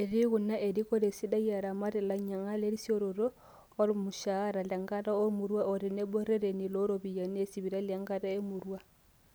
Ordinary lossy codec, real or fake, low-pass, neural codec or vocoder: none; real; none; none